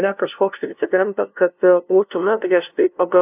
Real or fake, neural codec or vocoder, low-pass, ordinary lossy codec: fake; codec, 16 kHz, 0.5 kbps, FunCodec, trained on LibriTTS, 25 frames a second; 3.6 kHz; AAC, 32 kbps